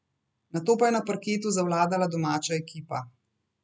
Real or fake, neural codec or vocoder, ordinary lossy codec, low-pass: real; none; none; none